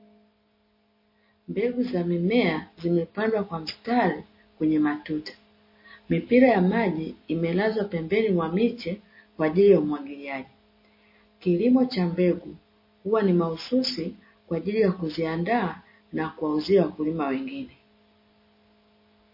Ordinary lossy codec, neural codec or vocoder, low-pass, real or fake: MP3, 24 kbps; none; 5.4 kHz; real